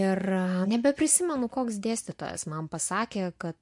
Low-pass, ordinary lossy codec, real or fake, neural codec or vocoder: 10.8 kHz; MP3, 64 kbps; fake; vocoder, 24 kHz, 100 mel bands, Vocos